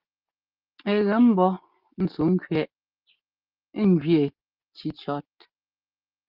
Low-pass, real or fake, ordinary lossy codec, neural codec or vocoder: 5.4 kHz; real; Opus, 24 kbps; none